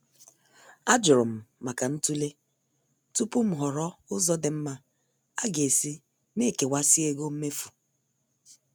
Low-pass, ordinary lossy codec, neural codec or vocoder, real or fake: none; none; none; real